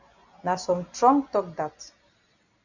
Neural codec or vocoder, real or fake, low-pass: none; real; 7.2 kHz